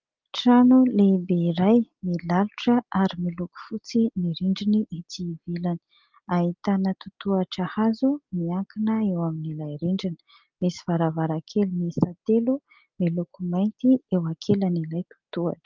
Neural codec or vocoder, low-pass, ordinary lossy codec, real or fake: none; 7.2 kHz; Opus, 32 kbps; real